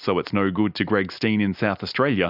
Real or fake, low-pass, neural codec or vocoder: real; 5.4 kHz; none